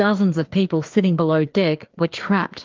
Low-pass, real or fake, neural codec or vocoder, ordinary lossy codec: 7.2 kHz; fake; codec, 16 kHz, 2 kbps, FreqCodec, larger model; Opus, 32 kbps